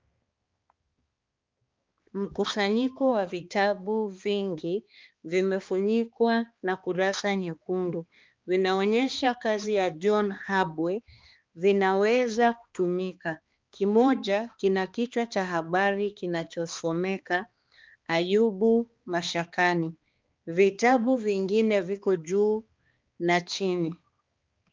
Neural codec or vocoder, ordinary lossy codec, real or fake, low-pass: codec, 16 kHz, 2 kbps, X-Codec, HuBERT features, trained on balanced general audio; Opus, 24 kbps; fake; 7.2 kHz